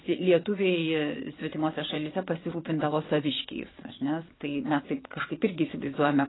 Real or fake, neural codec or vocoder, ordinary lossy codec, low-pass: fake; vocoder, 22.05 kHz, 80 mel bands, Vocos; AAC, 16 kbps; 7.2 kHz